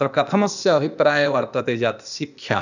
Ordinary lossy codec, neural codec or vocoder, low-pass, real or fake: none; codec, 16 kHz, 0.8 kbps, ZipCodec; 7.2 kHz; fake